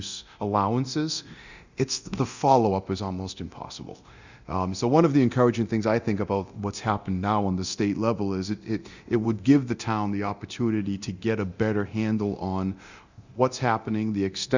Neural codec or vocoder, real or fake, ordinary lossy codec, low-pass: codec, 24 kHz, 0.9 kbps, DualCodec; fake; Opus, 64 kbps; 7.2 kHz